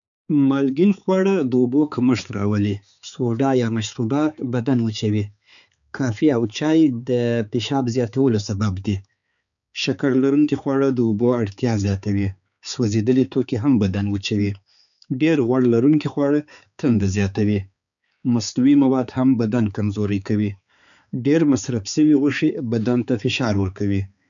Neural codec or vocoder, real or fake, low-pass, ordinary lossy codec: codec, 16 kHz, 4 kbps, X-Codec, HuBERT features, trained on balanced general audio; fake; 7.2 kHz; none